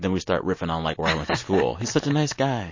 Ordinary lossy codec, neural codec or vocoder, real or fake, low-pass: MP3, 32 kbps; none; real; 7.2 kHz